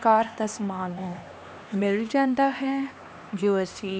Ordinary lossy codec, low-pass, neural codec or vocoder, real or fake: none; none; codec, 16 kHz, 2 kbps, X-Codec, HuBERT features, trained on LibriSpeech; fake